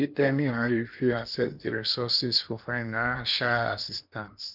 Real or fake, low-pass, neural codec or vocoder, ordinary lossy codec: fake; 5.4 kHz; codec, 16 kHz in and 24 kHz out, 0.8 kbps, FocalCodec, streaming, 65536 codes; none